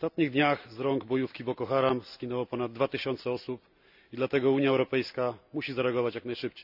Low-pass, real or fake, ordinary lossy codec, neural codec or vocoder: 5.4 kHz; real; none; none